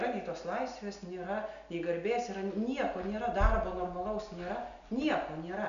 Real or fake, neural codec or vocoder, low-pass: real; none; 7.2 kHz